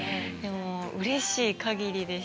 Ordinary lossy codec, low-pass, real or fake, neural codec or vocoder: none; none; real; none